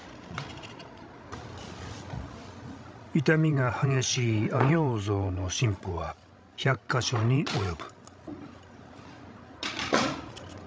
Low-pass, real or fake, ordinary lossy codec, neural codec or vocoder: none; fake; none; codec, 16 kHz, 16 kbps, FreqCodec, larger model